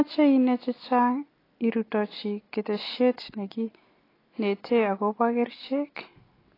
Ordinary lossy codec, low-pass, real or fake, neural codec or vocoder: AAC, 24 kbps; 5.4 kHz; real; none